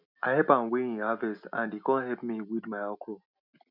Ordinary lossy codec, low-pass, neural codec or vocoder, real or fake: none; 5.4 kHz; none; real